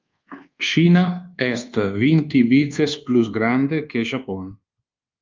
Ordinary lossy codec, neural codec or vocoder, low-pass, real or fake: Opus, 24 kbps; codec, 24 kHz, 1.2 kbps, DualCodec; 7.2 kHz; fake